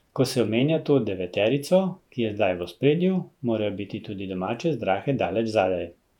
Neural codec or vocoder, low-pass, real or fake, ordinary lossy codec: vocoder, 48 kHz, 128 mel bands, Vocos; 19.8 kHz; fake; none